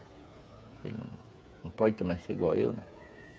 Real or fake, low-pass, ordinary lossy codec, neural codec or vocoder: fake; none; none; codec, 16 kHz, 8 kbps, FreqCodec, smaller model